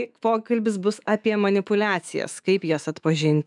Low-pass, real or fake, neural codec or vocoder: 10.8 kHz; fake; autoencoder, 48 kHz, 128 numbers a frame, DAC-VAE, trained on Japanese speech